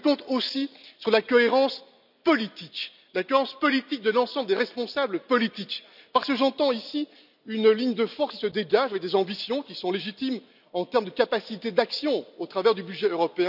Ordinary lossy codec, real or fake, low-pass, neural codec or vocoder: none; real; 5.4 kHz; none